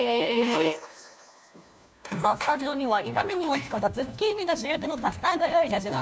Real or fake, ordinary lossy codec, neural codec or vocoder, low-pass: fake; none; codec, 16 kHz, 1 kbps, FunCodec, trained on LibriTTS, 50 frames a second; none